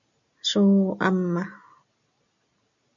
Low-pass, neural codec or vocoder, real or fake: 7.2 kHz; none; real